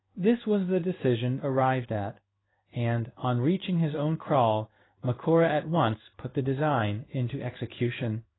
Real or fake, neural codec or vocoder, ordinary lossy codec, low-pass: fake; codec, 16 kHz in and 24 kHz out, 1 kbps, XY-Tokenizer; AAC, 16 kbps; 7.2 kHz